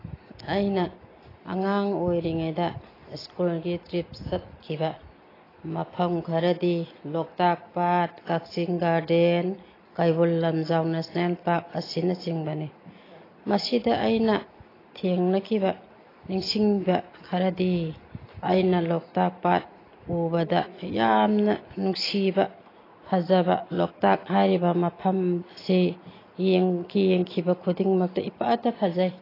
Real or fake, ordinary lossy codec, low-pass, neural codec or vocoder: real; AAC, 24 kbps; 5.4 kHz; none